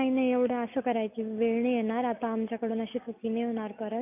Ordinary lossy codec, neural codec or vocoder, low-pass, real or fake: none; none; 3.6 kHz; real